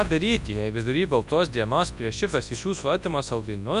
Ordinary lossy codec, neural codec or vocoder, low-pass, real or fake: MP3, 96 kbps; codec, 24 kHz, 0.9 kbps, WavTokenizer, large speech release; 10.8 kHz; fake